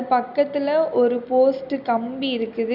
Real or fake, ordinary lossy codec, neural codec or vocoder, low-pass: real; none; none; 5.4 kHz